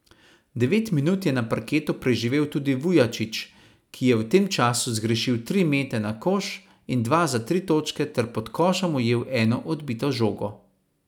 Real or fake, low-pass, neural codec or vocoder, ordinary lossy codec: real; 19.8 kHz; none; none